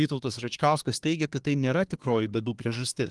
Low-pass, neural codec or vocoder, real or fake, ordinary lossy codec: 10.8 kHz; codec, 24 kHz, 1 kbps, SNAC; fake; Opus, 24 kbps